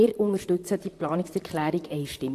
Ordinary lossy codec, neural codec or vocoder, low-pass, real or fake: AAC, 64 kbps; vocoder, 44.1 kHz, 128 mel bands, Pupu-Vocoder; 14.4 kHz; fake